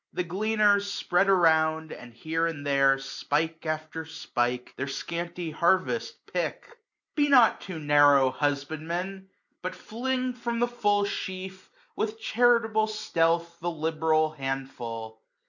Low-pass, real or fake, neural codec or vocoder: 7.2 kHz; real; none